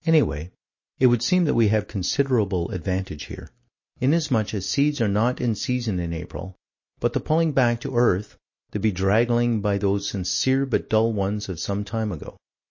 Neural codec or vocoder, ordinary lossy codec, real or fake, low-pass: none; MP3, 32 kbps; real; 7.2 kHz